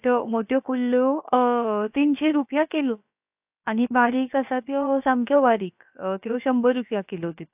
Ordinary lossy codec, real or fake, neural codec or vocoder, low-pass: AAC, 32 kbps; fake; codec, 16 kHz, about 1 kbps, DyCAST, with the encoder's durations; 3.6 kHz